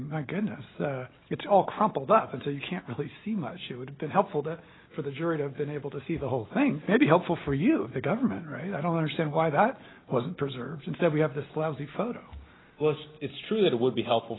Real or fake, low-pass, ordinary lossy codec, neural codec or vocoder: real; 7.2 kHz; AAC, 16 kbps; none